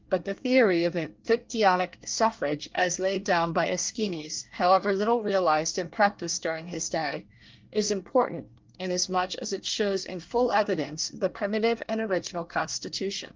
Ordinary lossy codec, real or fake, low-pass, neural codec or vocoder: Opus, 32 kbps; fake; 7.2 kHz; codec, 24 kHz, 1 kbps, SNAC